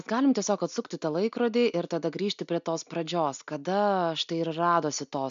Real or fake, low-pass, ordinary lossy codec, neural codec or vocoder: real; 7.2 kHz; MP3, 64 kbps; none